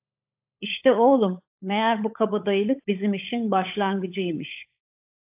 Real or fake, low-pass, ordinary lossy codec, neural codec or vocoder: fake; 3.6 kHz; AAC, 32 kbps; codec, 16 kHz, 16 kbps, FunCodec, trained on LibriTTS, 50 frames a second